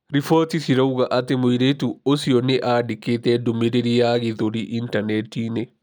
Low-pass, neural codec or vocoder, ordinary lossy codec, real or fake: 19.8 kHz; none; none; real